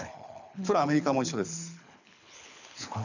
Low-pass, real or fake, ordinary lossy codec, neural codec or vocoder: 7.2 kHz; fake; none; codec, 24 kHz, 6 kbps, HILCodec